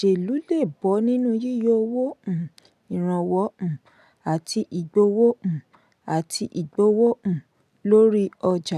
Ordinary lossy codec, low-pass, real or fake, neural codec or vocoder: none; 14.4 kHz; real; none